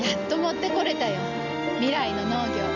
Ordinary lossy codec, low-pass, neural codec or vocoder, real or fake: none; 7.2 kHz; none; real